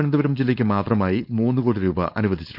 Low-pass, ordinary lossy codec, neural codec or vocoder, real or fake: 5.4 kHz; none; codec, 16 kHz, 4.8 kbps, FACodec; fake